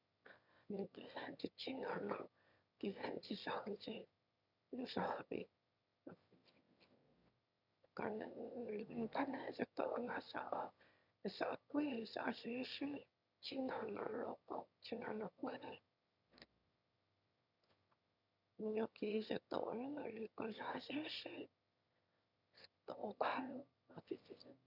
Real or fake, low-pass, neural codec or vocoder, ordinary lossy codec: fake; 5.4 kHz; autoencoder, 22.05 kHz, a latent of 192 numbers a frame, VITS, trained on one speaker; none